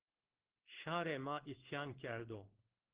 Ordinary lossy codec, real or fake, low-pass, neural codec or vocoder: Opus, 16 kbps; fake; 3.6 kHz; codec, 16 kHz in and 24 kHz out, 1 kbps, XY-Tokenizer